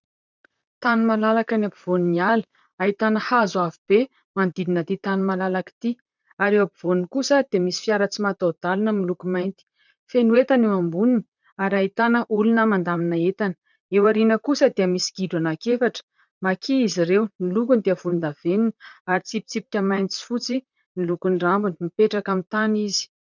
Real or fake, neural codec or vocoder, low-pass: fake; vocoder, 44.1 kHz, 128 mel bands, Pupu-Vocoder; 7.2 kHz